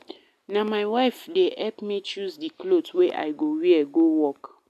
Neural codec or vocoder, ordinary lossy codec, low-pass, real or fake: none; none; 14.4 kHz; real